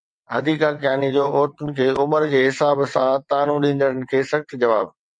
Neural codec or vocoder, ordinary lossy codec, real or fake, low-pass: vocoder, 22.05 kHz, 80 mel bands, WaveNeXt; MP3, 48 kbps; fake; 9.9 kHz